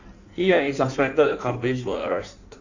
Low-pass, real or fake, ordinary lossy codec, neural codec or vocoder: 7.2 kHz; fake; none; codec, 16 kHz in and 24 kHz out, 1.1 kbps, FireRedTTS-2 codec